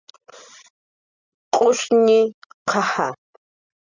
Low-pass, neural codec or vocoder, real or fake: 7.2 kHz; none; real